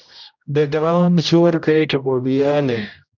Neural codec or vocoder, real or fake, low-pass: codec, 16 kHz, 0.5 kbps, X-Codec, HuBERT features, trained on general audio; fake; 7.2 kHz